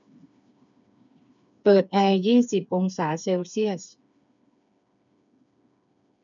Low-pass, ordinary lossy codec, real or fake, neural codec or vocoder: 7.2 kHz; none; fake; codec, 16 kHz, 4 kbps, FreqCodec, smaller model